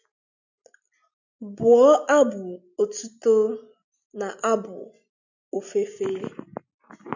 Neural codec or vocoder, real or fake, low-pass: none; real; 7.2 kHz